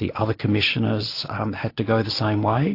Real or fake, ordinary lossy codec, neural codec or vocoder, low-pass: real; AAC, 32 kbps; none; 5.4 kHz